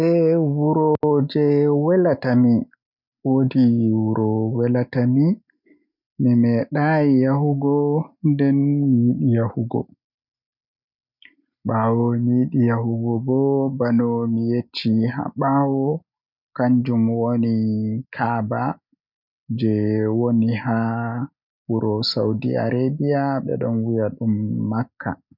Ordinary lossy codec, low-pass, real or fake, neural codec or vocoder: none; 5.4 kHz; real; none